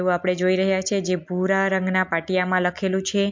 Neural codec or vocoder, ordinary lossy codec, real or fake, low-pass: none; MP3, 48 kbps; real; 7.2 kHz